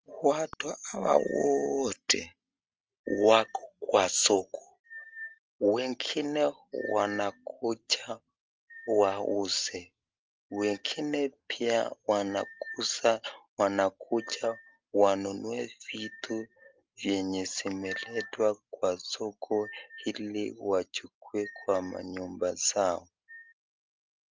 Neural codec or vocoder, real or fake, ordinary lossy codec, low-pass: none; real; Opus, 24 kbps; 7.2 kHz